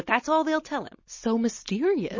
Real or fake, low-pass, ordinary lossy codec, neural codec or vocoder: real; 7.2 kHz; MP3, 32 kbps; none